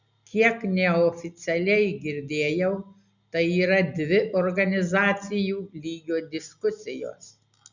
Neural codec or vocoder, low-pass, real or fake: none; 7.2 kHz; real